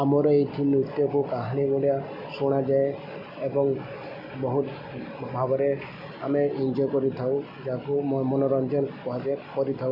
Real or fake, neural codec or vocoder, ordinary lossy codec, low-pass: real; none; none; 5.4 kHz